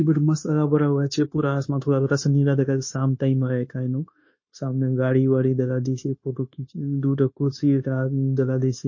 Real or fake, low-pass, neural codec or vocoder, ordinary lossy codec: fake; 7.2 kHz; codec, 16 kHz, 0.9 kbps, LongCat-Audio-Codec; MP3, 32 kbps